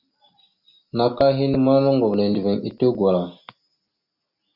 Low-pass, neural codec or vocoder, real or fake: 5.4 kHz; none; real